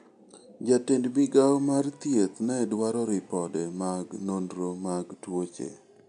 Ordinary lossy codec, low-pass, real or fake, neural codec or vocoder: none; 9.9 kHz; real; none